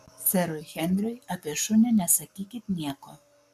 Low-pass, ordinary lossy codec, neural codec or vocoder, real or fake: 14.4 kHz; AAC, 96 kbps; codec, 44.1 kHz, 7.8 kbps, Pupu-Codec; fake